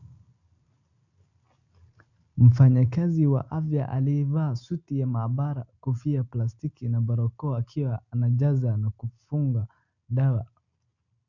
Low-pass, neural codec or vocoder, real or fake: 7.2 kHz; none; real